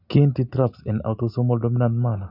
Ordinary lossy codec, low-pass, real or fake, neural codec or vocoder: none; 5.4 kHz; real; none